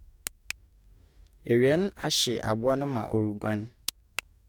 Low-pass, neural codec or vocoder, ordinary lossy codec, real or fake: 19.8 kHz; codec, 44.1 kHz, 2.6 kbps, DAC; none; fake